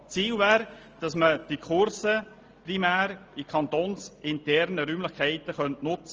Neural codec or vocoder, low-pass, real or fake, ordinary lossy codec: none; 7.2 kHz; real; Opus, 24 kbps